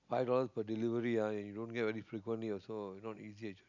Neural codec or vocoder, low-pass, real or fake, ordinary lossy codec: none; 7.2 kHz; real; none